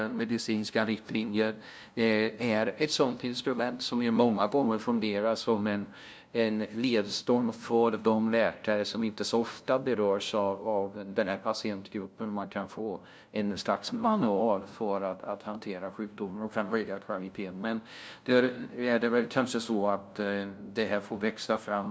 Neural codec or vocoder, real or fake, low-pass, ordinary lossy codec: codec, 16 kHz, 0.5 kbps, FunCodec, trained on LibriTTS, 25 frames a second; fake; none; none